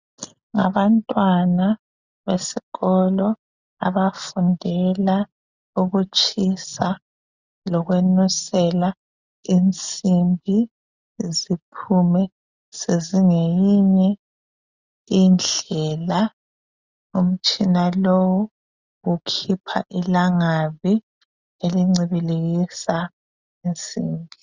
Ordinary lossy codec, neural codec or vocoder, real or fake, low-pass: Opus, 64 kbps; none; real; 7.2 kHz